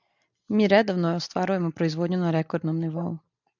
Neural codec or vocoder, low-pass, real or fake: none; 7.2 kHz; real